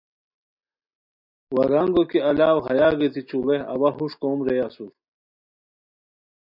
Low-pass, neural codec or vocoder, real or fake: 5.4 kHz; none; real